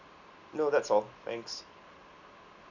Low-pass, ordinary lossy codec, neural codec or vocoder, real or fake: 7.2 kHz; none; none; real